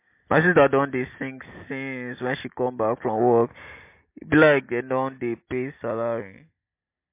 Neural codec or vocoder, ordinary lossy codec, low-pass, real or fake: none; MP3, 24 kbps; 3.6 kHz; real